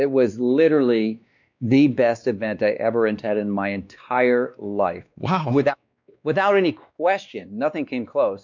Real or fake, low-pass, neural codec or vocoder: fake; 7.2 kHz; codec, 16 kHz, 2 kbps, X-Codec, WavLM features, trained on Multilingual LibriSpeech